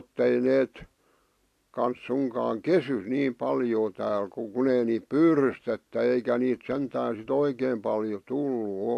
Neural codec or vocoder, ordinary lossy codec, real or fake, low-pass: none; AAC, 64 kbps; real; 14.4 kHz